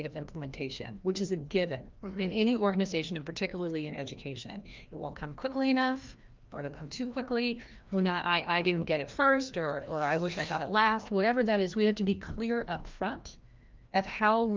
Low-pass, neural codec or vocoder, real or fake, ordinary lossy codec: 7.2 kHz; codec, 16 kHz, 1 kbps, FreqCodec, larger model; fake; Opus, 24 kbps